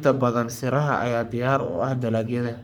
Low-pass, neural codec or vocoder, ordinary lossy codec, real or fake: none; codec, 44.1 kHz, 3.4 kbps, Pupu-Codec; none; fake